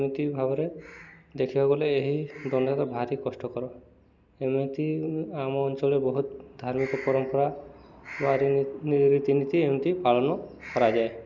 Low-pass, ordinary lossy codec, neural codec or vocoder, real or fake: 7.2 kHz; none; none; real